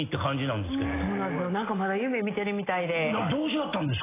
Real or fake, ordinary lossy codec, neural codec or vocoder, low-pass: real; AAC, 16 kbps; none; 3.6 kHz